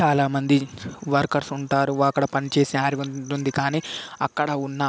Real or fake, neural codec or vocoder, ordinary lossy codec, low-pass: real; none; none; none